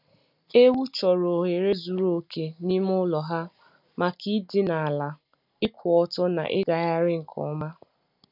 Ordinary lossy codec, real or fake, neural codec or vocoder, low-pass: none; real; none; 5.4 kHz